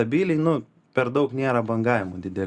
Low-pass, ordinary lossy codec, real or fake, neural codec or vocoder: 10.8 kHz; Opus, 64 kbps; real; none